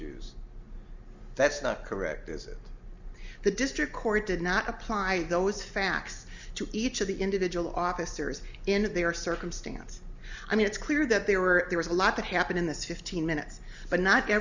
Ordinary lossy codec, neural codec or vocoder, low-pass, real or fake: Opus, 64 kbps; none; 7.2 kHz; real